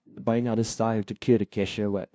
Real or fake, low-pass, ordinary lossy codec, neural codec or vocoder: fake; none; none; codec, 16 kHz, 0.5 kbps, FunCodec, trained on LibriTTS, 25 frames a second